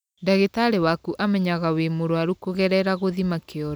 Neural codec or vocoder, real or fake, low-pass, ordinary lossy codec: none; real; none; none